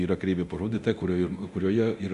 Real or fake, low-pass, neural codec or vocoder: fake; 10.8 kHz; codec, 24 kHz, 0.9 kbps, DualCodec